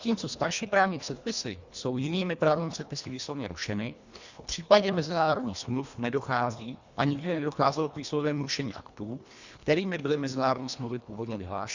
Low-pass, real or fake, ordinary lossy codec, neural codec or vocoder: 7.2 kHz; fake; Opus, 64 kbps; codec, 24 kHz, 1.5 kbps, HILCodec